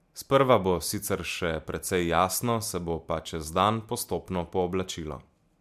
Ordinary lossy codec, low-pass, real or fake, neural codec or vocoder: MP3, 96 kbps; 14.4 kHz; real; none